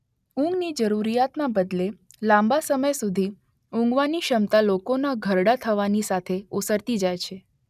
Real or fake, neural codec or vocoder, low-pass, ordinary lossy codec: real; none; 14.4 kHz; none